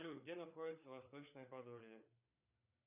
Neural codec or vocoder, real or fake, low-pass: codec, 16 kHz, 4 kbps, FreqCodec, larger model; fake; 3.6 kHz